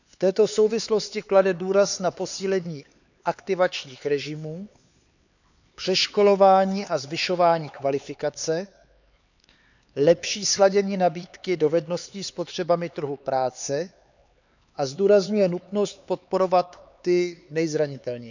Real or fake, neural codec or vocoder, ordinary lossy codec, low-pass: fake; codec, 16 kHz, 4 kbps, X-Codec, HuBERT features, trained on LibriSpeech; none; 7.2 kHz